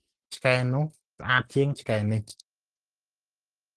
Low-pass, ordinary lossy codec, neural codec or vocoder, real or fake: 10.8 kHz; Opus, 16 kbps; none; real